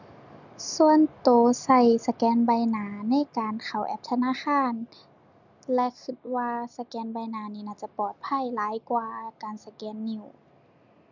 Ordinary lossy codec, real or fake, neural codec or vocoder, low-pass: none; real; none; 7.2 kHz